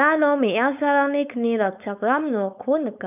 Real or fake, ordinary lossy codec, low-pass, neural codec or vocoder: fake; none; 3.6 kHz; codec, 16 kHz, 4.8 kbps, FACodec